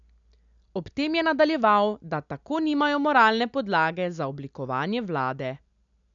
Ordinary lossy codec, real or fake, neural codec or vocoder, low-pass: none; real; none; 7.2 kHz